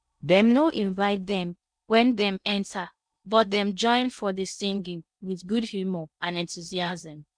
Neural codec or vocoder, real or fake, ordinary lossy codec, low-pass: codec, 16 kHz in and 24 kHz out, 0.6 kbps, FocalCodec, streaming, 2048 codes; fake; none; 9.9 kHz